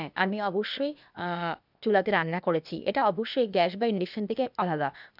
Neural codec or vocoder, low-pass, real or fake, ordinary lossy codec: codec, 16 kHz, 0.8 kbps, ZipCodec; 5.4 kHz; fake; none